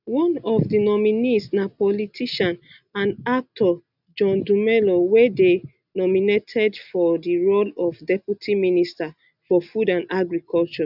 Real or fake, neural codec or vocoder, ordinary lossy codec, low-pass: real; none; none; 5.4 kHz